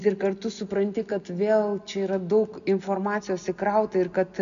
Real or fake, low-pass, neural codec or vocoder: real; 7.2 kHz; none